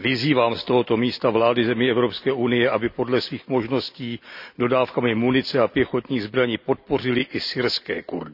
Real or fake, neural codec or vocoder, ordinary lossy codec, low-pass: real; none; none; 5.4 kHz